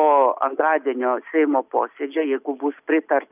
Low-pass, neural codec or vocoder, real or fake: 3.6 kHz; none; real